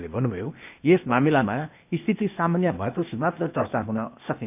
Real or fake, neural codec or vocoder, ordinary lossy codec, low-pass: fake; codec, 16 kHz, 0.8 kbps, ZipCodec; none; 3.6 kHz